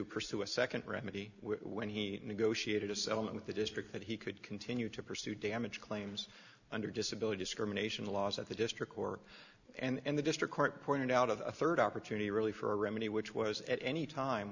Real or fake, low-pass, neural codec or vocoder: real; 7.2 kHz; none